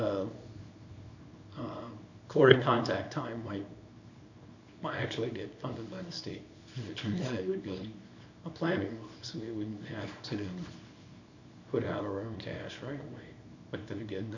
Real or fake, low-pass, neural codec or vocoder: fake; 7.2 kHz; codec, 24 kHz, 0.9 kbps, WavTokenizer, small release